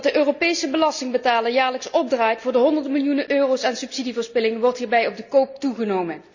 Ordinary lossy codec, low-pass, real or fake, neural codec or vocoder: none; 7.2 kHz; real; none